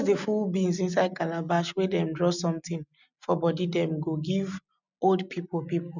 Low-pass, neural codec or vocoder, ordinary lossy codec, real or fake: 7.2 kHz; none; none; real